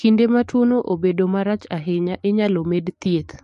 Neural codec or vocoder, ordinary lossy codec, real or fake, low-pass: codec, 44.1 kHz, 7.8 kbps, DAC; MP3, 48 kbps; fake; 14.4 kHz